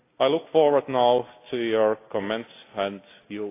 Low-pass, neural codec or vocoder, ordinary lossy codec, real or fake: 3.6 kHz; none; AAC, 24 kbps; real